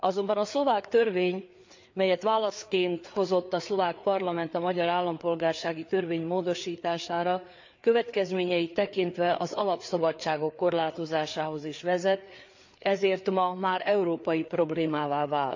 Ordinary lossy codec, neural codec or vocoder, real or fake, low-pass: MP3, 64 kbps; codec, 16 kHz, 4 kbps, FreqCodec, larger model; fake; 7.2 kHz